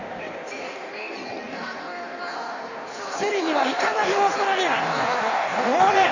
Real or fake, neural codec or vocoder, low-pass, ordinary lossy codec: fake; codec, 16 kHz in and 24 kHz out, 1.1 kbps, FireRedTTS-2 codec; 7.2 kHz; none